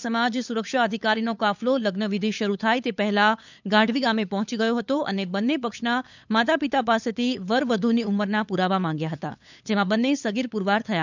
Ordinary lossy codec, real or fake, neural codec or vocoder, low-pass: none; fake; codec, 16 kHz, 8 kbps, FunCodec, trained on LibriTTS, 25 frames a second; 7.2 kHz